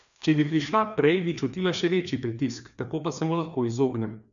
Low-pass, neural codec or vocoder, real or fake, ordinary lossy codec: 7.2 kHz; codec, 16 kHz, 2 kbps, FreqCodec, larger model; fake; none